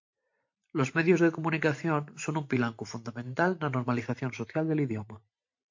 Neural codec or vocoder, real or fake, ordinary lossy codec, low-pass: vocoder, 44.1 kHz, 128 mel bands every 512 samples, BigVGAN v2; fake; MP3, 48 kbps; 7.2 kHz